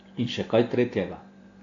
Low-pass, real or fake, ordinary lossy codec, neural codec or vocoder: 7.2 kHz; real; AAC, 32 kbps; none